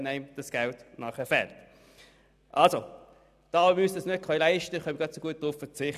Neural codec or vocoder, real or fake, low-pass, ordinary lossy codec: none; real; 14.4 kHz; none